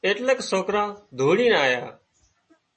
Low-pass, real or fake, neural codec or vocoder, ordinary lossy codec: 10.8 kHz; real; none; MP3, 32 kbps